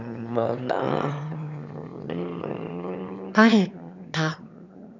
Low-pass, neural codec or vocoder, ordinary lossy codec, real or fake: 7.2 kHz; autoencoder, 22.05 kHz, a latent of 192 numbers a frame, VITS, trained on one speaker; none; fake